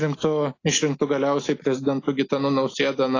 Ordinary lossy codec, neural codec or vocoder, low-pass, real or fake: AAC, 32 kbps; none; 7.2 kHz; real